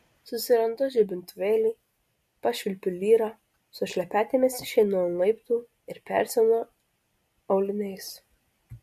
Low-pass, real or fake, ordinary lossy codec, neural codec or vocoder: 14.4 kHz; real; MP3, 64 kbps; none